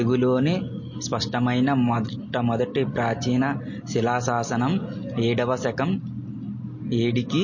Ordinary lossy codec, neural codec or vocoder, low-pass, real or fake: MP3, 32 kbps; none; 7.2 kHz; real